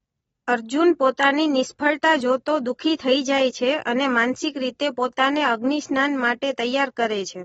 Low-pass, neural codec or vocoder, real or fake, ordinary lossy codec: 19.8 kHz; none; real; AAC, 24 kbps